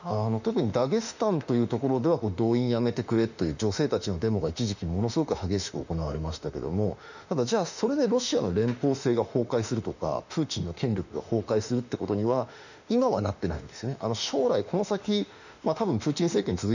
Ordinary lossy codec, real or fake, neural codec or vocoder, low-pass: none; fake; autoencoder, 48 kHz, 32 numbers a frame, DAC-VAE, trained on Japanese speech; 7.2 kHz